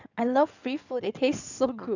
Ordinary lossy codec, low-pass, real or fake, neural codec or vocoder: none; 7.2 kHz; fake; codec, 16 kHz in and 24 kHz out, 2.2 kbps, FireRedTTS-2 codec